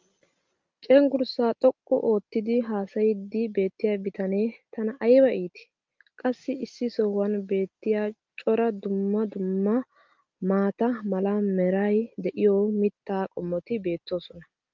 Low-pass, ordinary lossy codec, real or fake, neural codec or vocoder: 7.2 kHz; Opus, 32 kbps; real; none